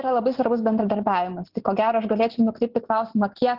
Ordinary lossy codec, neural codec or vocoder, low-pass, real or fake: Opus, 16 kbps; none; 5.4 kHz; real